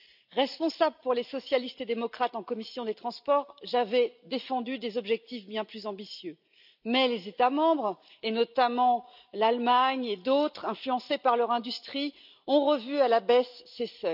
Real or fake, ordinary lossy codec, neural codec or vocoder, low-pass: real; none; none; 5.4 kHz